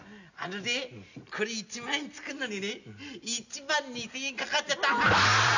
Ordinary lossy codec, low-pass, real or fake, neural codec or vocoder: none; 7.2 kHz; real; none